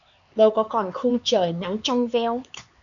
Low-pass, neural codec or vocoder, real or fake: 7.2 kHz; codec, 16 kHz, 4 kbps, X-Codec, HuBERT features, trained on LibriSpeech; fake